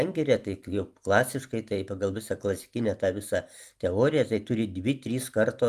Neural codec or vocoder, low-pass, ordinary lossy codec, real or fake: none; 14.4 kHz; Opus, 32 kbps; real